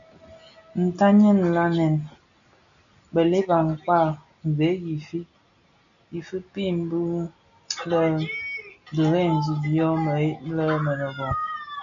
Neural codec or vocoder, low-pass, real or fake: none; 7.2 kHz; real